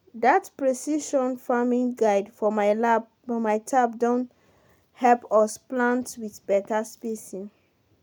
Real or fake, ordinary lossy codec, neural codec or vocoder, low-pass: real; none; none; none